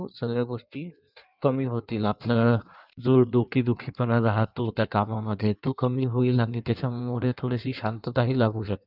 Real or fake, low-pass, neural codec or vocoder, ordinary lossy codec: fake; 5.4 kHz; codec, 16 kHz in and 24 kHz out, 1.1 kbps, FireRedTTS-2 codec; none